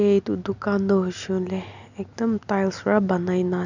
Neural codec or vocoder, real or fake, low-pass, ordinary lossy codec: none; real; 7.2 kHz; none